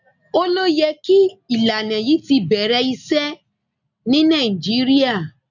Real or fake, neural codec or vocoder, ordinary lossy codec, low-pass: real; none; none; 7.2 kHz